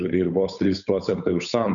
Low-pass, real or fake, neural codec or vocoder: 7.2 kHz; fake; codec, 16 kHz, 8 kbps, FunCodec, trained on Chinese and English, 25 frames a second